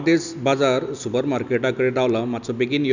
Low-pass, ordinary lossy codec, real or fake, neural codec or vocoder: 7.2 kHz; none; real; none